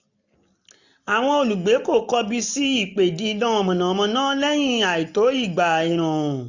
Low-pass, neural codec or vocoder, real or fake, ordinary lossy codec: 7.2 kHz; none; real; none